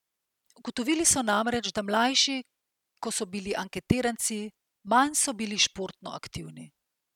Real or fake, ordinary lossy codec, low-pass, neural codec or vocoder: real; none; 19.8 kHz; none